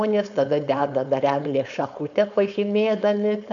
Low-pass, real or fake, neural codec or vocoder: 7.2 kHz; fake; codec, 16 kHz, 4.8 kbps, FACodec